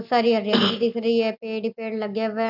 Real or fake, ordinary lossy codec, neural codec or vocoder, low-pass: real; AAC, 48 kbps; none; 5.4 kHz